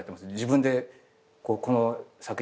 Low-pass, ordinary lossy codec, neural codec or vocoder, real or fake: none; none; none; real